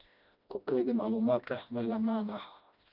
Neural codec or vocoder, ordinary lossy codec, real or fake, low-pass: codec, 16 kHz, 1 kbps, FreqCodec, smaller model; none; fake; 5.4 kHz